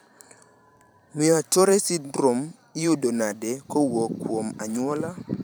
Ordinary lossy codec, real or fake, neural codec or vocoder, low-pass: none; fake; vocoder, 44.1 kHz, 128 mel bands every 512 samples, BigVGAN v2; none